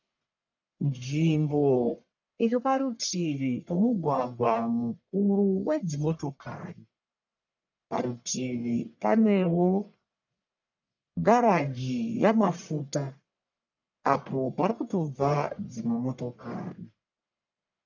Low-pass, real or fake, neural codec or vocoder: 7.2 kHz; fake; codec, 44.1 kHz, 1.7 kbps, Pupu-Codec